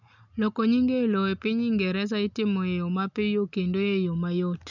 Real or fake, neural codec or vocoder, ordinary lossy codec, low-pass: real; none; none; 7.2 kHz